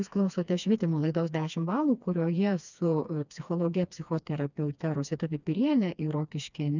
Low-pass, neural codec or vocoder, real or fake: 7.2 kHz; codec, 16 kHz, 2 kbps, FreqCodec, smaller model; fake